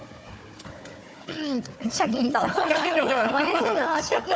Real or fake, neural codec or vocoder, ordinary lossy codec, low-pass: fake; codec, 16 kHz, 4 kbps, FunCodec, trained on Chinese and English, 50 frames a second; none; none